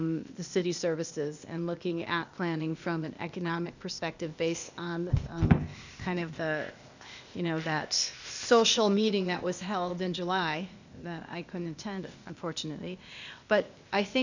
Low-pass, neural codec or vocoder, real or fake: 7.2 kHz; codec, 16 kHz, 0.8 kbps, ZipCodec; fake